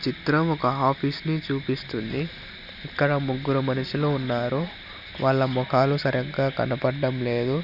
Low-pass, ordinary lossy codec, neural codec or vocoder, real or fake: 5.4 kHz; none; none; real